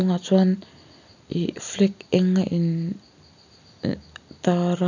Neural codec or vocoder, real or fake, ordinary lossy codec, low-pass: none; real; none; 7.2 kHz